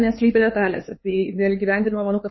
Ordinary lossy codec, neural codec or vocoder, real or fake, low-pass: MP3, 24 kbps; codec, 16 kHz, 4 kbps, X-Codec, HuBERT features, trained on LibriSpeech; fake; 7.2 kHz